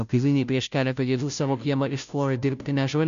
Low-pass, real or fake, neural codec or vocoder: 7.2 kHz; fake; codec, 16 kHz, 0.5 kbps, FunCodec, trained on Chinese and English, 25 frames a second